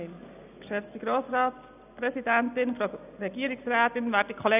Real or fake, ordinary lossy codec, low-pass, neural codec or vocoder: real; none; 3.6 kHz; none